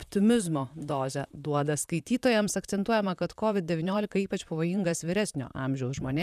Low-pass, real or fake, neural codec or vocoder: 14.4 kHz; fake; vocoder, 44.1 kHz, 128 mel bands, Pupu-Vocoder